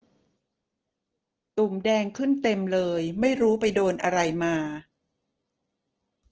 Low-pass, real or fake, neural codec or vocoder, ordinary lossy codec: 7.2 kHz; real; none; Opus, 16 kbps